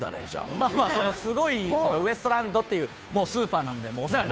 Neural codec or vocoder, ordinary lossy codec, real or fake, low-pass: codec, 16 kHz, 2 kbps, FunCodec, trained on Chinese and English, 25 frames a second; none; fake; none